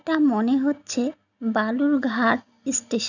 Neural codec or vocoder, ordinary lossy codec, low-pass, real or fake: none; none; 7.2 kHz; real